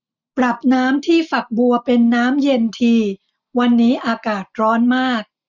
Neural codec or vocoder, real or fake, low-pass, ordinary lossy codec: none; real; 7.2 kHz; none